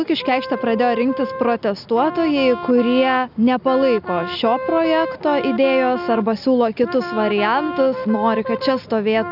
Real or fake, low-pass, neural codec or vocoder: real; 5.4 kHz; none